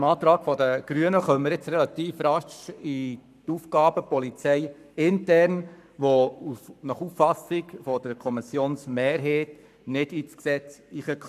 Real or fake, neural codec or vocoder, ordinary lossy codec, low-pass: fake; codec, 44.1 kHz, 7.8 kbps, Pupu-Codec; none; 14.4 kHz